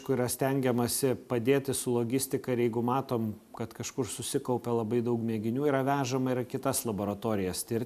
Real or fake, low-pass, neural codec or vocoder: real; 14.4 kHz; none